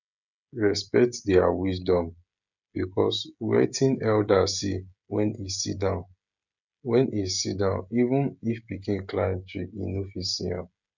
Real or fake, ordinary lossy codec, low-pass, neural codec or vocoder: fake; none; 7.2 kHz; codec, 16 kHz, 16 kbps, FreqCodec, smaller model